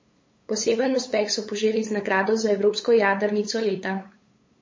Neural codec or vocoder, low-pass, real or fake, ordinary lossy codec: codec, 16 kHz, 8 kbps, FunCodec, trained on LibriTTS, 25 frames a second; 7.2 kHz; fake; MP3, 32 kbps